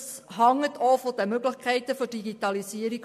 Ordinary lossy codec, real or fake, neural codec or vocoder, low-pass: MP3, 64 kbps; real; none; 14.4 kHz